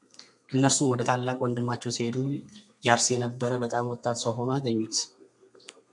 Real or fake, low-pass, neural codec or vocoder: fake; 10.8 kHz; codec, 32 kHz, 1.9 kbps, SNAC